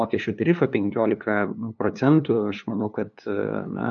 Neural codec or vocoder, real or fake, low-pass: codec, 16 kHz, 2 kbps, FunCodec, trained on LibriTTS, 25 frames a second; fake; 7.2 kHz